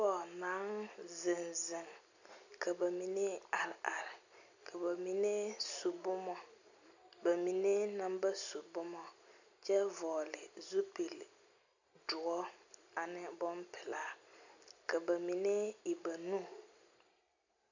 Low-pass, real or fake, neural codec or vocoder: 7.2 kHz; real; none